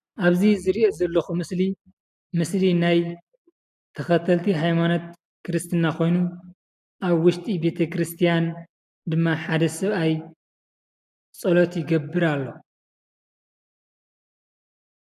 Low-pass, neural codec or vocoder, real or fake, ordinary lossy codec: 14.4 kHz; none; real; AAC, 96 kbps